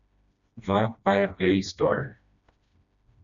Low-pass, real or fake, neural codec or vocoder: 7.2 kHz; fake; codec, 16 kHz, 1 kbps, FreqCodec, smaller model